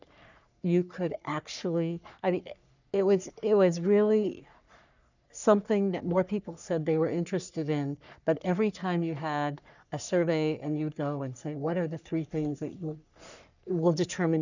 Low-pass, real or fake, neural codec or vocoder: 7.2 kHz; fake; codec, 44.1 kHz, 3.4 kbps, Pupu-Codec